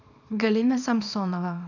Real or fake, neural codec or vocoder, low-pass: fake; codec, 24 kHz, 0.9 kbps, WavTokenizer, small release; 7.2 kHz